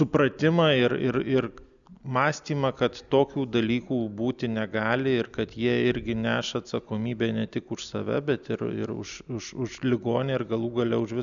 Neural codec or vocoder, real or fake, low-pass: none; real; 7.2 kHz